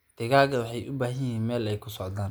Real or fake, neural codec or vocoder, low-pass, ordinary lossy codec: real; none; none; none